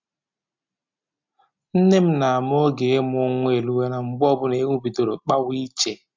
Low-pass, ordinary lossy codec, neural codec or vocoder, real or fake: 7.2 kHz; MP3, 64 kbps; none; real